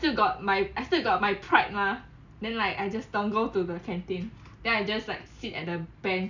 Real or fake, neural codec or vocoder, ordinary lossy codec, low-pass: real; none; none; 7.2 kHz